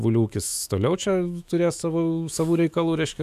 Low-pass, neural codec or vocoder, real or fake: 14.4 kHz; autoencoder, 48 kHz, 128 numbers a frame, DAC-VAE, trained on Japanese speech; fake